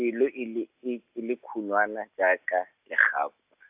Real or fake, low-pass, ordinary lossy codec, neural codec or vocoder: real; 3.6 kHz; AAC, 32 kbps; none